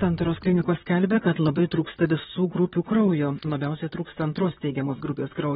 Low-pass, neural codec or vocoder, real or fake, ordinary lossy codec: 19.8 kHz; vocoder, 44.1 kHz, 128 mel bands, Pupu-Vocoder; fake; AAC, 16 kbps